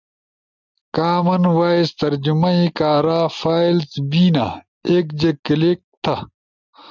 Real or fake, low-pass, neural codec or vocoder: real; 7.2 kHz; none